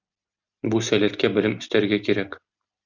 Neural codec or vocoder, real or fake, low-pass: none; real; 7.2 kHz